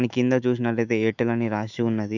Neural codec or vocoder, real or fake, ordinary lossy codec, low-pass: autoencoder, 48 kHz, 128 numbers a frame, DAC-VAE, trained on Japanese speech; fake; none; 7.2 kHz